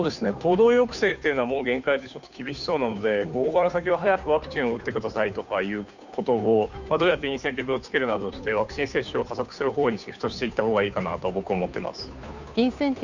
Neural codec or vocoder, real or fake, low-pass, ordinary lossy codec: codec, 16 kHz, 2 kbps, FunCodec, trained on Chinese and English, 25 frames a second; fake; 7.2 kHz; none